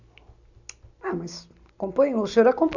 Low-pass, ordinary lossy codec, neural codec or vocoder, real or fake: 7.2 kHz; none; none; real